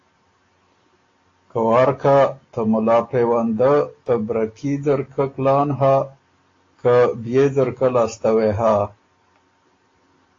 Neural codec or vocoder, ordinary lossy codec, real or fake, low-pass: none; AAC, 32 kbps; real; 7.2 kHz